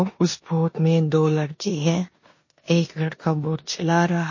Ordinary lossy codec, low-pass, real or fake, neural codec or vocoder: MP3, 32 kbps; 7.2 kHz; fake; codec, 16 kHz in and 24 kHz out, 0.9 kbps, LongCat-Audio-Codec, four codebook decoder